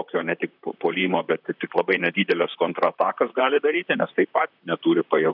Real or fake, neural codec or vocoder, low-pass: fake; vocoder, 44.1 kHz, 128 mel bands, Pupu-Vocoder; 5.4 kHz